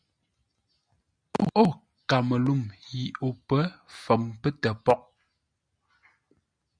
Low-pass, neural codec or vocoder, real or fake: 9.9 kHz; none; real